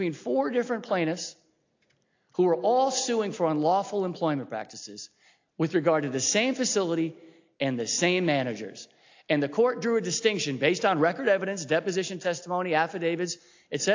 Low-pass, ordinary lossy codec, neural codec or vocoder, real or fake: 7.2 kHz; AAC, 48 kbps; none; real